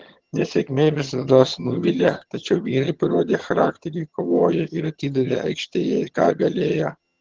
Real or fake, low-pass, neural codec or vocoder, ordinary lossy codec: fake; 7.2 kHz; vocoder, 22.05 kHz, 80 mel bands, HiFi-GAN; Opus, 16 kbps